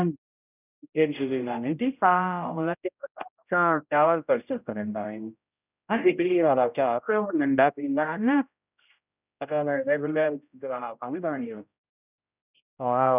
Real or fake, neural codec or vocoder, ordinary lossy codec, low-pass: fake; codec, 16 kHz, 0.5 kbps, X-Codec, HuBERT features, trained on general audio; none; 3.6 kHz